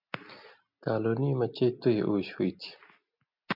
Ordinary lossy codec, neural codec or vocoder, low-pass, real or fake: MP3, 48 kbps; none; 5.4 kHz; real